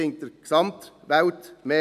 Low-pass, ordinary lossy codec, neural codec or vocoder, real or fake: 14.4 kHz; none; none; real